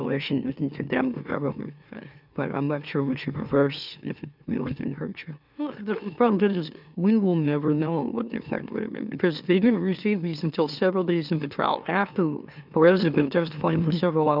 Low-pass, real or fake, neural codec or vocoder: 5.4 kHz; fake; autoencoder, 44.1 kHz, a latent of 192 numbers a frame, MeloTTS